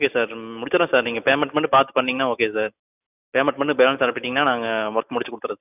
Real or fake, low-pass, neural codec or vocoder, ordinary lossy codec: real; 3.6 kHz; none; none